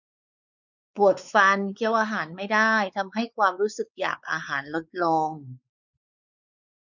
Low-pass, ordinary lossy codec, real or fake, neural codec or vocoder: 7.2 kHz; none; fake; codec, 16 kHz, 4 kbps, X-Codec, WavLM features, trained on Multilingual LibriSpeech